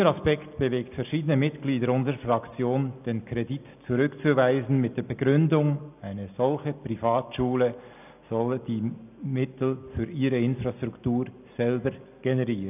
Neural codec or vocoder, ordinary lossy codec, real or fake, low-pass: none; none; real; 3.6 kHz